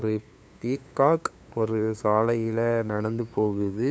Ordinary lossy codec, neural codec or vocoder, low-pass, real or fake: none; codec, 16 kHz, 2 kbps, FunCodec, trained on LibriTTS, 25 frames a second; none; fake